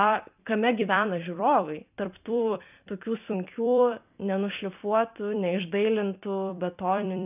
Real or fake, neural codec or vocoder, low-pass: fake; vocoder, 44.1 kHz, 128 mel bands every 256 samples, BigVGAN v2; 3.6 kHz